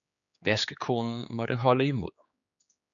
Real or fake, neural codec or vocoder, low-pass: fake; codec, 16 kHz, 4 kbps, X-Codec, HuBERT features, trained on general audio; 7.2 kHz